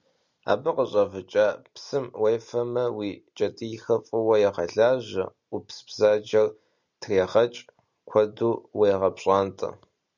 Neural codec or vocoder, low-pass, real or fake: none; 7.2 kHz; real